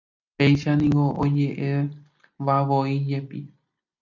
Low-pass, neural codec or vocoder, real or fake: 7.2 kHz; none; real